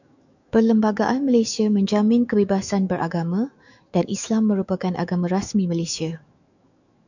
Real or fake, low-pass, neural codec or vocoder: fake; 7.2 kHz; autoencoder, 48 kHz, 128 numbers a frame, DAC-VAE, trained on Japanese speech